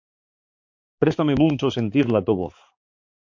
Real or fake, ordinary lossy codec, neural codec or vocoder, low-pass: fake; MP3, 48 kbps; codec, 16 kHz, 4 kbps, X-Codec, HuBERT features, trained on balanced general audio; 7.2 kHz